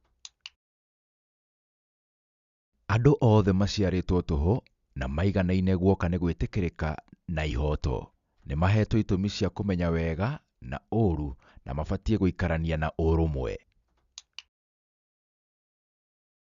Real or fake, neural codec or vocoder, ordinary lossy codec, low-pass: real; none; Opus, 64 kbps; 7.2 kHz